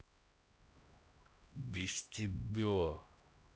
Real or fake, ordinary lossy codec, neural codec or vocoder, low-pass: fake; none; codec, 16 kHz, 1 kbps, X-Codec, HuBERT features, trained on LibriSpeech; none